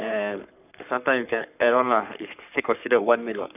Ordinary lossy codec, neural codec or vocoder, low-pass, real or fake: none; codec, 44.1 kHz, 3.4 kbps, Pupu-Codec; 3.6 kHz; fake